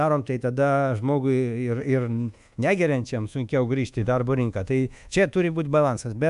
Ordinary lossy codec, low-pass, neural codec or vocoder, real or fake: AAC, 96 kbps; 10.8 kHz; codec, 24 kHz, 1.2 kbps, DualCodec; fake